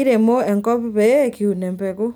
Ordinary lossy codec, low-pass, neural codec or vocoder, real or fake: none; none; none; real